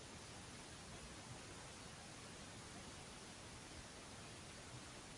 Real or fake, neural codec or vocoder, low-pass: real; none; 10.8 kHz